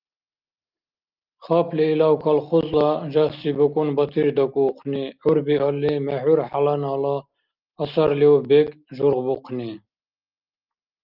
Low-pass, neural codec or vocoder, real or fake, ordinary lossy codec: 5.4 kHz; none; real; Opus, 32 kbps